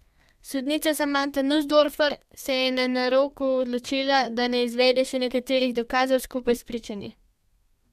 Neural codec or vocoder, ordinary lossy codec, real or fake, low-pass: codec, 32 kHz, 1.9 kbps, SNAC; MP3, 96 kbps; fake; 14.4 kHz